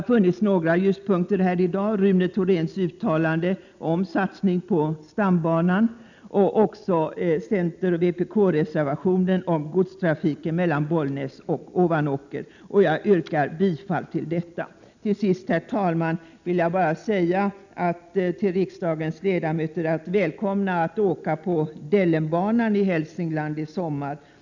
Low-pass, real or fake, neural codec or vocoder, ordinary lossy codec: 7.2 kHz; real; none; none